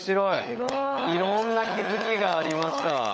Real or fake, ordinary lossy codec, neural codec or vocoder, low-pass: fake; none; codec, 16 kHz, 16 kbps, FunCodec, trained on LibriTTS, 50 frames a second; none